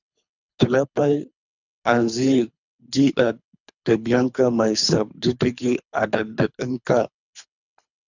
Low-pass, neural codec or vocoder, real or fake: 7.2 kHz; codec, 24 kHz, 3 kbps, HILCodec; fake